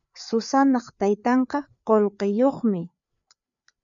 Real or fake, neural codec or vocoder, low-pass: fake; codec, 16 kHz, 4 kbps, FreqCodec, larger model; 7.2 kHz